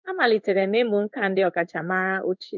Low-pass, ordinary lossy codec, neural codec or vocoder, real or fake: 7.2 kHz; MP3, 64 kbps; codec, 16 kHz in and 24 kHz out, 1 kbps, XY-Tokenizer; fake